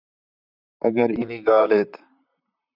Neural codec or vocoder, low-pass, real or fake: vocoder, 44.1 kHz, 128 mel bands, Pupu-Vocoder; 5.4 kHz; fake